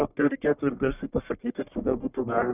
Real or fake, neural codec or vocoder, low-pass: fake; codec, 44.1 kHz, 1.7 kbps, Pupu-Codec; 3.6 kHz